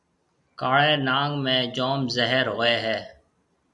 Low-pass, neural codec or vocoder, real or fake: 10.8 kHz; none; real